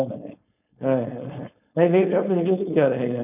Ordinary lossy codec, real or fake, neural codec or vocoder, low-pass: none; fake; codec, 16 kHz, 4.8 kbps, FACodec; 3.6 kHz